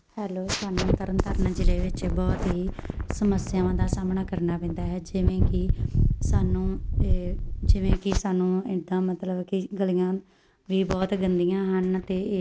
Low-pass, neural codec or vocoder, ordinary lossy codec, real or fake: none; none; none; real